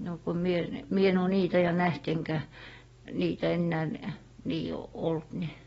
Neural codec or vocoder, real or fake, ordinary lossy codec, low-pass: none; real; AAC, 24 kbps; 10.8 kHz